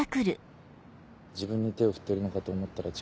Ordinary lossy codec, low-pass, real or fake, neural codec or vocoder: none; none; real; none